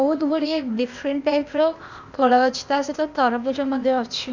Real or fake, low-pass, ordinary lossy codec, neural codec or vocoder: fake; 7.2 kHz; none; codec, 16 kHz, 0.8 kbps, ZipCodec